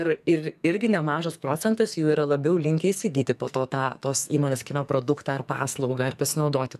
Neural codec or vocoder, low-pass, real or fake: codec, 44.1 kHz, 2.6 kbps, SNAC; 14.4 kHz; fake